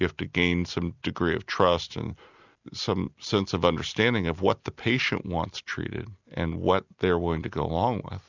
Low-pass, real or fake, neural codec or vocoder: 7.2 kHz; real; none